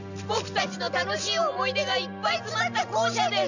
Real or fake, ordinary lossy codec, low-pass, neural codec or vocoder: real; none; 7.2 kHz; none